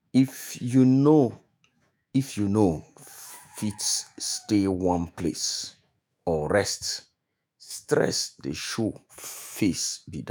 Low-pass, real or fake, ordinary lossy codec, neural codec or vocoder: none; fake; none; autoencoder, 48 kHz, 128 numbers a frame, DAC-VAE, trained on Japanese speech